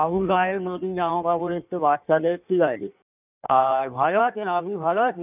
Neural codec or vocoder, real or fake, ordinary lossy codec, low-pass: codec, 16 kHz in and 24 kHz out, 1.1 kbps, FireRedTTS-2 codec; fake; none; 3.6 kHz